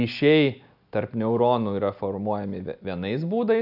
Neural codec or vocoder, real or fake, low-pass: none; real; 5.4 kHz